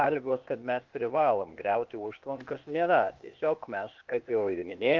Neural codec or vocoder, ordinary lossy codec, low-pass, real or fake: codec, 16 kHz, 0.8 kbps, ZipCodec; Opus, 24 kbps; 7.2 kHz; fake